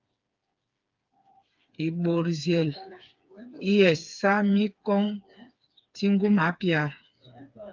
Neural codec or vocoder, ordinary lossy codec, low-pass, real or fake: codec, 16 kHz, 4 kbps, FreqCodec, smaller model; Opus, 24 kbps; 7.2 kHz; fake